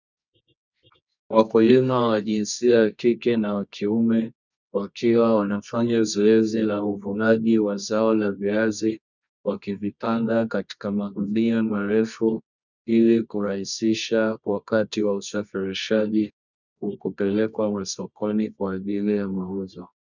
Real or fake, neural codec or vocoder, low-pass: fake; codec, 24 kHz, 0.9 kbps, WavTokenizer, medium music audio release; 7.2 kHz